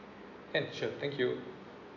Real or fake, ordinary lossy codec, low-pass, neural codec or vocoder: real; MP3, 64 kbps; 7.2 kHz; none